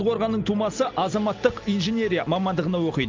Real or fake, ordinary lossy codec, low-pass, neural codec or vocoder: real; Opus, 32 kbps; 7.2 kHz; none